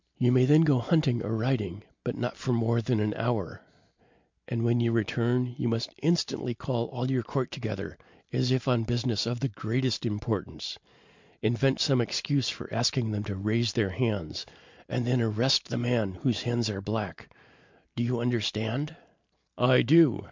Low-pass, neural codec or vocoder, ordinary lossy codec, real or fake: 7.2 kHz; none; MP3, 64 kbps; real